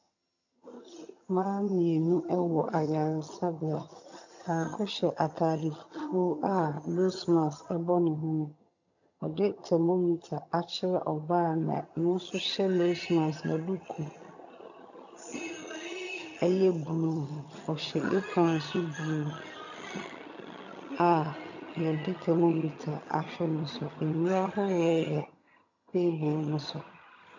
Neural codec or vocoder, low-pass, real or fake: vocoder, 22.05 kHz, 80 mel bands, HiFi-GAN; 7.2 kHz; fake